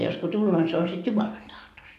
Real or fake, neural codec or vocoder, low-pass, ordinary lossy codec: fake; codec, 44.1 kHz, 7.8 kbps, DAC; 14.4 kHz; none